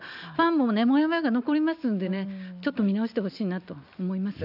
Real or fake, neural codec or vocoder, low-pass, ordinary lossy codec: real; none; 5.4 kHz; none